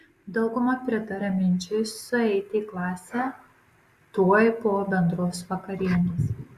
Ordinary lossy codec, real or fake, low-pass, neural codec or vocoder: Opus, 64 kbps; real; 14.4 kHz; none